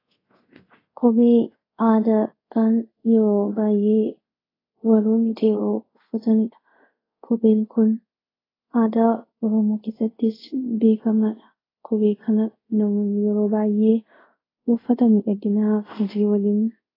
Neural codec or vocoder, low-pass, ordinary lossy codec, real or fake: codec, 24 kHz, 0.5 kbps, DualCodec; 5.4 kHz; AAC, 24 kbps; fake